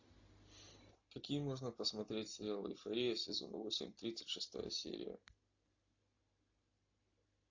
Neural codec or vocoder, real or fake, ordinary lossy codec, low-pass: none; real; AAC, 48 kbps; 7.2 kHz